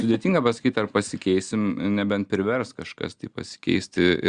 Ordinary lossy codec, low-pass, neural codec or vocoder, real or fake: Opus, 64 kbps; 9.9 kHz; none; real